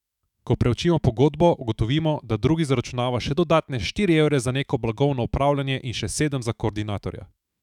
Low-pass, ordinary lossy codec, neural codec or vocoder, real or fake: 19.8 kHz; none; autoencoder, 48 kHz, 128 numbers a frame, DAC-VAE, trained on Japanese speech; fake